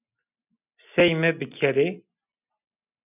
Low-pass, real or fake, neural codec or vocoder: 3.6 kHz; real; none